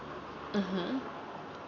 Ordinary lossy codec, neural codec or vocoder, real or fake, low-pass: none; none; real; 7.2 kHz